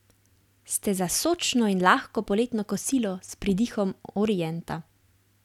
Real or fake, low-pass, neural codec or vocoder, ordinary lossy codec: real; 19.8 kHz; none; none